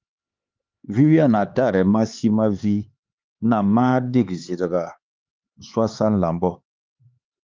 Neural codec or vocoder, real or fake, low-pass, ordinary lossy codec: codec, 16 kHz, 4 kbps, X-Codec, HuBERT features, trained on LibriSpeech; fake; 7.2 kHz; Opus, 24 kbps